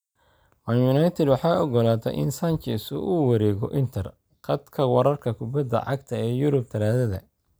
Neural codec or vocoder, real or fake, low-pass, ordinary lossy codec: none; real; none; none